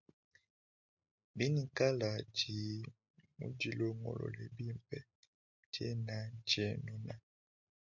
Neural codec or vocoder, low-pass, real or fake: none; 7.2 kHz; real